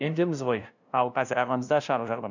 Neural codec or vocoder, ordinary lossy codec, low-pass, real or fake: codec, 16 kHz, 0.5 kbps, FunCodec, trained on LibriTTS, 25 frames a second; none; 7.2 kHz; fake